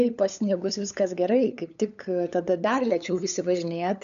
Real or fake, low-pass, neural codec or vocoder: fake; 7.2 kHz; codec, 16 kHz, 8 kbps, FunCodec, trained on LibriTTS, 25 frames a second